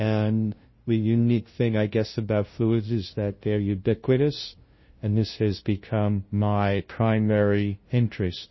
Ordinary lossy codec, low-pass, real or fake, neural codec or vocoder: MP3, 24 kbps; 7.2 kHz; fake; codec, 16 kHz, 0.5 kbps, FunCodec, trained on LibriTTS, 25 frames a second